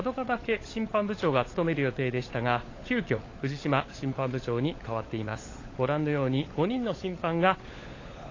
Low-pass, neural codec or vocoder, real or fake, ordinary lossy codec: 7.2 kHz; codec, 16 kHz, 16 kbps, FunCodec, trained on LibriTTS, 50 frames a second; fake; AAC, 32 kbps